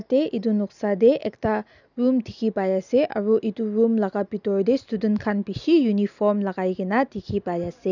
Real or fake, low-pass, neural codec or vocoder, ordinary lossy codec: real; 7.2 kHz; none; none